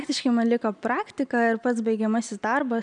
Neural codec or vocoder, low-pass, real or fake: none; 9.9 kHz; real